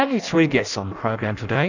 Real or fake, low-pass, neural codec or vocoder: fake; 7.2 kHz; codec, 16 kHz in and 24 kHz out, 0.6 kbps, FireRedTTS-2 codec